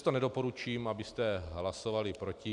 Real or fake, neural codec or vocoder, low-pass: real; none; 10.8 kHz